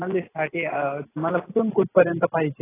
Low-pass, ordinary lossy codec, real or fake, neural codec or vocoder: 3.6 kHz; AAC, 16 kbps; real; none